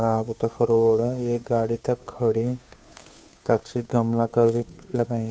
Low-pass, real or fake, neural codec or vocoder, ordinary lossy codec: none; fake; codec, 16 kHz, 2 kbps, FunCodec, trained on Chinese and English, 25 frames a second; none